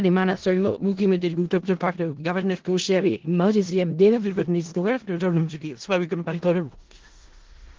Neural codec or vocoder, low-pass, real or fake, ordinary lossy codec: codec, 16 kHz in and 24 kHz out, 0.4 kbps, LongCat-Audio-Codec, four codebook decoder; 7.2 kHz; fake; Opus, 16 kbps